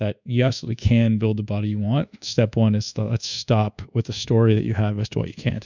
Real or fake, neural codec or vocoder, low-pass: fake; codec, 24 kHz, 1.2 kbps, DualCodec; 7.2 kHz